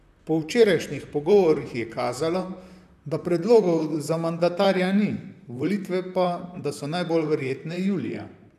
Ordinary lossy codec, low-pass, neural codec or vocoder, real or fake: none; 14.4 kHz; vocoder, 44.1 kHz, 128 mel bands, Pupu-Vocoder; fake